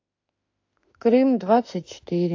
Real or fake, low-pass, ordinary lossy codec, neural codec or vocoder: fake; 7.2 kHz; AAC, 48 kbps; autoencoder, 48 kHz, 32 numbers a frame, DAC-VAE, trained on Japanese speech